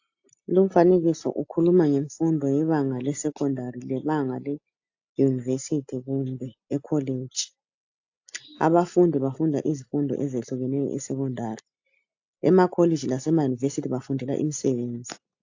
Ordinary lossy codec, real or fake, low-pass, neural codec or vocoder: AAC, 48 kbps; real; 7.2 kHz; none